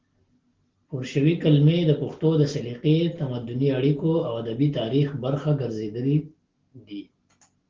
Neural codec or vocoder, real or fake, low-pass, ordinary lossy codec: none; real; 7.2 kHz; Opus, 16 kbps